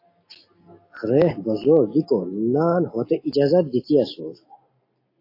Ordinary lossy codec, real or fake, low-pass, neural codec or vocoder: AAC, 32 kbps; real; 5.4 kHz; none